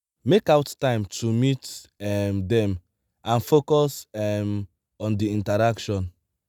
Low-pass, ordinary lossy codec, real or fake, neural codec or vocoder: none; none; real; none